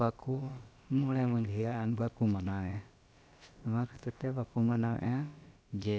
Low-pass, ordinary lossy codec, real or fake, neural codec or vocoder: none; none; fake; codec, 16 kHz, about 1 kbps, DyCAST, with the encoder's durations